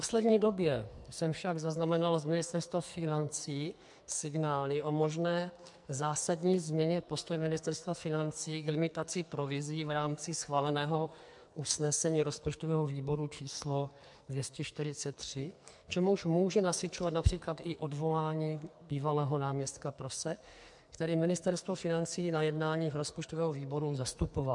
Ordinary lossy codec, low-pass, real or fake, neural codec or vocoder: MP3, 64 kbps; 10.8 kHz; fake; codec, 44.1 kHz, 2.6 kbps, SNAC